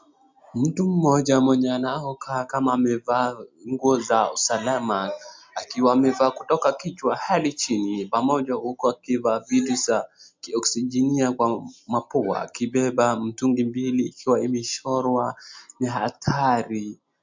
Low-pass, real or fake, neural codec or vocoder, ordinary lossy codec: 7.2 kHz; real; none; MP3, 64 kbps